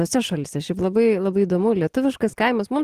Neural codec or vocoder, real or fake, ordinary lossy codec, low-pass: none; real; Opus, 16 kbps; 14.4 kHz